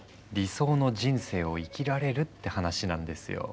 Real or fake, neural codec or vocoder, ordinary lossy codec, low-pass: real; none; none; none